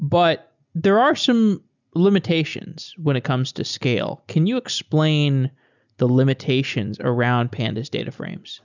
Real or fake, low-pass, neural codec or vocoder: real; 7.2 kHz; none